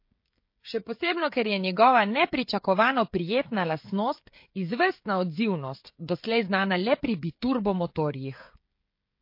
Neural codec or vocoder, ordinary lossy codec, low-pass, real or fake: codec, 16 kHz, 16 kbps, FreqCodec, smaller model; MP3, 32 kbps; 5.4 kHz; fake